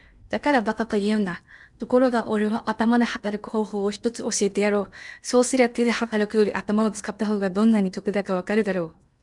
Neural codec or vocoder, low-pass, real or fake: codec, 16 kHz in and 24 kHz out, 0.8 kbps, FocalCodec, streaming, 65536 codes; 10.8 kHz; fake